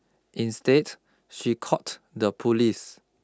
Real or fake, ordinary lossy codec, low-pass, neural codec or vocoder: real; none; none; none